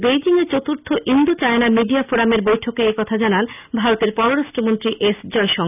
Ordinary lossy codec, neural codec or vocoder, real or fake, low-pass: none; none; real; 3.6 kHz